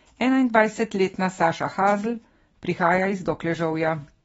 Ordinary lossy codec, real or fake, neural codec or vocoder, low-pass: AAC, 24 kbps; fake; autoencoder, 48 kHz, 128 numbers a frame, DAC-VAE, trained on Japanese speech; 19.8 kHz